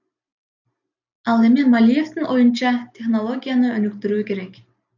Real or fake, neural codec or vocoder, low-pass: real; none; 7.2 kHz